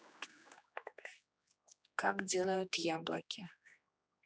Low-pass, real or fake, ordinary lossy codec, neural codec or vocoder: none; fake; none; codec, 16 kHz, 2 kbps, X-Codec, HuBERT features, trained on general audio